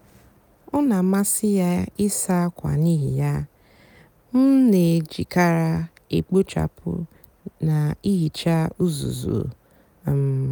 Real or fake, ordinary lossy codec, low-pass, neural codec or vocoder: real; none; none; none